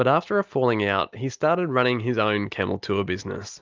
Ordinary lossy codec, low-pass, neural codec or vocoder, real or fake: Opus, 24 kbps; 7.2 kHz; none; real